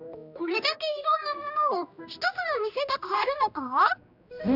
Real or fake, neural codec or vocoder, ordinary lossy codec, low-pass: fake; codec, 16 kHz, 1 kbps, X-Codec, HuBERT features, trained on general audio; none; 5.4 kHz